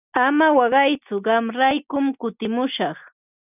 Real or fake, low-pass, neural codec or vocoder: real; 3.6 kHz; none